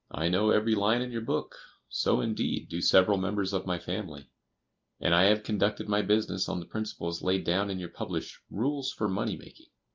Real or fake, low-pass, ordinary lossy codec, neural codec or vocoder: real; 7.2 kHz; Opus, 32 kbps; none